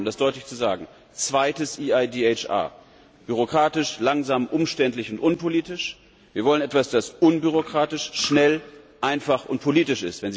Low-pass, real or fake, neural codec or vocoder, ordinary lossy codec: none; real; none; none